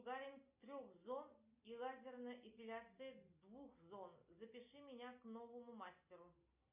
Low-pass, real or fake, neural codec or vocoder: 3.6 kHz; real; none